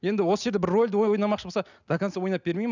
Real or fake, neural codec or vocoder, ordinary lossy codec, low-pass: real; none; none; 7.2 kHz